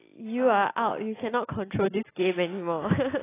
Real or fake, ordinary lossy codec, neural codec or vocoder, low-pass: real; AAC, 16 kbps; none; 3.6 kHz